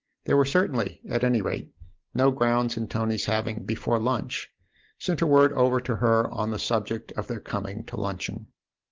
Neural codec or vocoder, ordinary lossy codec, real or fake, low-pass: none; Opus, 16 kbps; real; 7.2 kHz